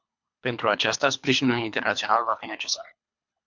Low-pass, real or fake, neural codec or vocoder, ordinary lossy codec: 7.2 kHz; fake; codec, 24 kHz, 3 kbps, HILCodec; MP3, 64 kbps